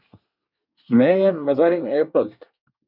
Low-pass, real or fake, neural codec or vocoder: 5.4 kHz; fake; codec, 24 kHz, 1 kbps, SNAC